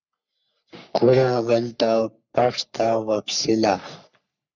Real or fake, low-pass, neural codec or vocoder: fake; 7.2 kHz; codec, 44.1 kHz, 3.4 kbps, Pupu-Codec